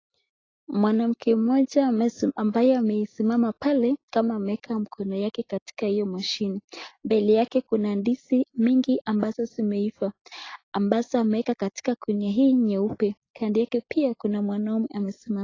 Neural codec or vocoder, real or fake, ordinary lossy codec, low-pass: none; real; AAC, 32 kbps; 7.2 kHz